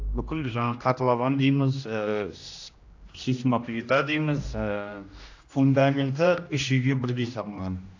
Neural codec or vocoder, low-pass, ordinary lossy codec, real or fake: codec, 16 kHz, 1 kbps, X-Codec, HuBERT features, trained on general audio; 7.2 kHz; AAC, 48 kbps; fake